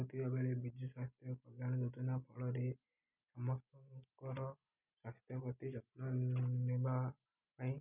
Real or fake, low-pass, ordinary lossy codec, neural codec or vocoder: real; 3.6 kHz; none; none